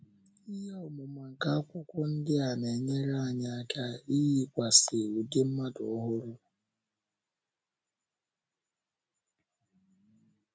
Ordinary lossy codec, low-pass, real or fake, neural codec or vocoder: none; none; real; none